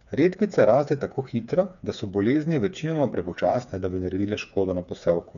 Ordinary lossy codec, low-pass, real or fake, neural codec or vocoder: none; 7.2 kHz; fake; codec, 16 kHz, 4 kbps, FreqCodec, smaller model